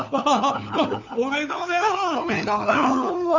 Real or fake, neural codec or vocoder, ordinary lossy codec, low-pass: fake; codec, 16 kHz, 4 kbps, FunCodec, trained on LibriTTS, 50 frames a second; none; 7.2 kHz